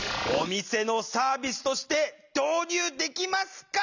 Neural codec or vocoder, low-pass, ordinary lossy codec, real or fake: none; 7.2 kHz; none; real